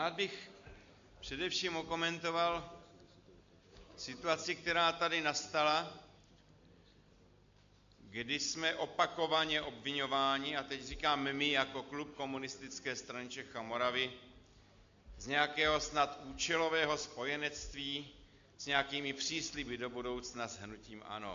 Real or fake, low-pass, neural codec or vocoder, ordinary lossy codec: real; 7.2 kHz; none; AAC, 64 kbps